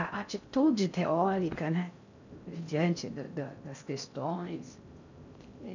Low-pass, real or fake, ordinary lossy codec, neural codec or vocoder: 7.2 kHz; fake; none; codec, 16 kHz in and 24 kHz out, 0.6 kbps, FocalCodec, streaming, 4096 codes